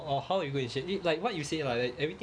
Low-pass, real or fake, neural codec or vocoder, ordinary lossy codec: 9.9 kHz; real; none; none